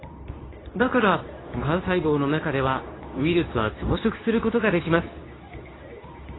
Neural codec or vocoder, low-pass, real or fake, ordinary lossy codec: codec, 24 kHz, 0.9 kbps, WavTokenizer, medium speech release version 2; 7.2 kHz; fake; AAC, 16 kbps